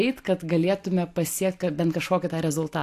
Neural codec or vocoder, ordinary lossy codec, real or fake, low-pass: none; AAC, 64 kbps; real; 14.4 kHz